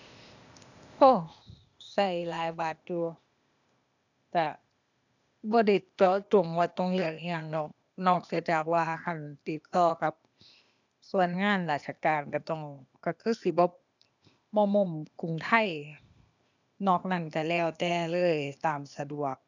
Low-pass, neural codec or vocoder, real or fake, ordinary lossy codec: 7.2 kHz; codec, 16 kHz, 0.8 kbps, ZipCodec; fake; none